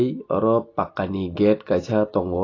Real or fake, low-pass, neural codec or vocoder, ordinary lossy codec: real; 7.2 kHz; none; AAC, 32 kbps